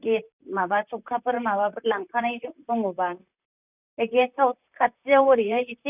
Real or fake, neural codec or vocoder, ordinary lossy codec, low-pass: fake; vocoder, 44.1 kHz, 128 mel bands, Pupu-Vocoder; none; 3.6 kHz